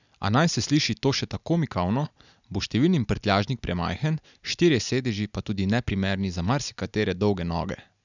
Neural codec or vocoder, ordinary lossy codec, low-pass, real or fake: none; none; 7.2 kHz; real